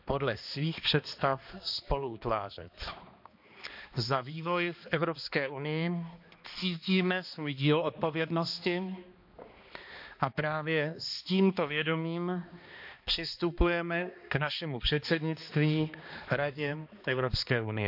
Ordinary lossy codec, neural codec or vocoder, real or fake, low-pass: MP3, 48 kbps; codec, 16 kHz, 2 kbps, X-Codec, HuBERT features, trained on balanced general audio; fake; 5.4 kHz